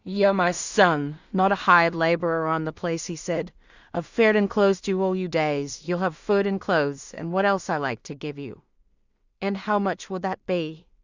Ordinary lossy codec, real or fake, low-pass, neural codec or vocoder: Opus, 64 kbps; fake; 7.2 kHz; codec, 16 kHz in and 24 kHz out, 0.4 kbps, LongCat-Audio-Codec, two codebook decoder